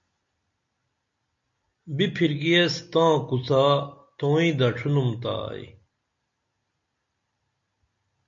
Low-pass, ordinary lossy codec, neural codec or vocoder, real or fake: 7.2 kHz; MP3, 48 kbps; none; real